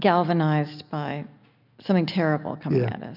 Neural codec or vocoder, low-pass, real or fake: none; 5.4 kHz; real